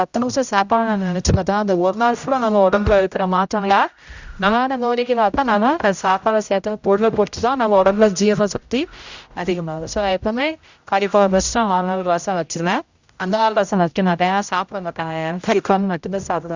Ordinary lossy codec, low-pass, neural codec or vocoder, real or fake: Opus, 64 kbps; 7.2 kHz; codec, 16 kHz, 0.5 kbps, X-Codec, HuBERT features, trained on general audio; fake